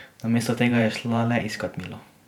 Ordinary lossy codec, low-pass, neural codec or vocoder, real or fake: none; 19.8 kHz; vocoder, 44.1 kHz, 128 mel bands every 512 samples, BigVGAN v2; fake